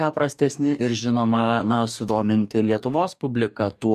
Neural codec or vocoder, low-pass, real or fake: codec, 44.1 kHz, 2.6 kbps, DAC; 14.4 kHz; fake